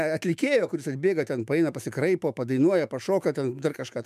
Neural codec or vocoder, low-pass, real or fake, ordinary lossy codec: autoencoder, 48 kHz, 128 numbers a frame, DAC-VAE, trained on Japanese speech; 14.4 kHz; fake; MP3, 96 kbps